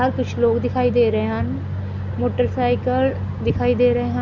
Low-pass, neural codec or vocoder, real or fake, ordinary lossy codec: 7.2 kHz; none; real; none